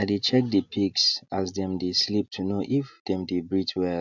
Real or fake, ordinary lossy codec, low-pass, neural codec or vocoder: real; none; 7.2 kHz; none